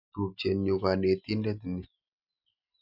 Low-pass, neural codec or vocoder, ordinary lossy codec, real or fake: 5.4 kHz; none; MP3, 48 kbps; real